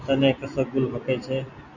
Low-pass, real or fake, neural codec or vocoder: 7.2 kHz; real; none